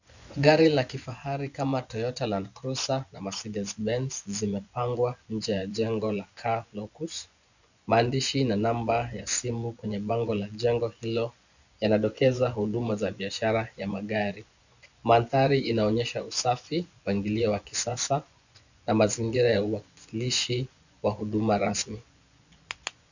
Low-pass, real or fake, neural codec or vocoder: 7.2 kHz; fake; vocoder, 24 kHz, 100 mel bands, Vocos